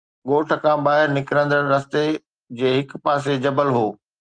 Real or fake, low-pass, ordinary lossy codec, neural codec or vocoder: real; 9.9 kHz; Opus, 24 kbps; none